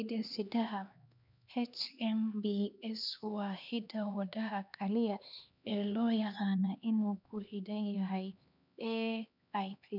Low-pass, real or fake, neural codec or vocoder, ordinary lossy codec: 5.4 kHz; fake; codec, 16 kHz, 2 kbps, X-Codec, HuBERT features, trained on LibriSpeech; none